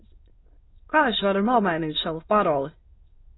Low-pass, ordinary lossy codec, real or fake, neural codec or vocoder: 7.2 kHz; AAC, 16 kbps; fake; autoencoder, 22.05 kHz, a latent of 192 numbers a frame, VITS, trained on many speakers